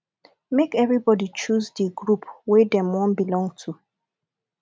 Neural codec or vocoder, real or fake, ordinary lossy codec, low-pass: none; real; none; none